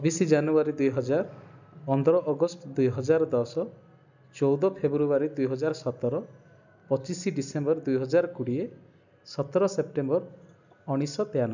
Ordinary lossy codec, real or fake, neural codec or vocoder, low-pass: none; real; none; 7.2 kHz